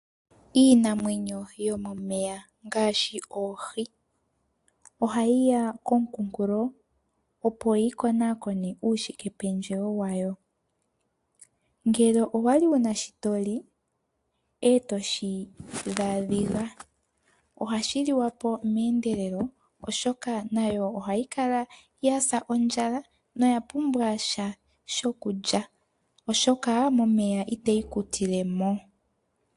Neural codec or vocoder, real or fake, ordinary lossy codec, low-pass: none; real; AAC, 64 kbps; 10.8 kHz